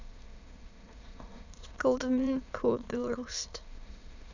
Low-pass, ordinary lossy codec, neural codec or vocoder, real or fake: 7.2 kHz; none; autoencoder, 22.05 kHz, a latent of 192 numbers a frame, VITS, trained on many speakers; fake